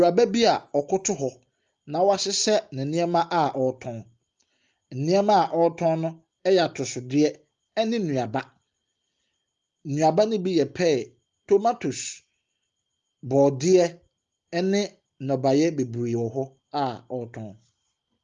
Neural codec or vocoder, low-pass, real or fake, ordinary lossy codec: none; 7.2 kHz; real; Opus, 32 kbps